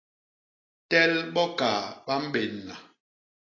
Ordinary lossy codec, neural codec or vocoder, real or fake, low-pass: AAC, 32 kbps; none; real; 7.2 kHz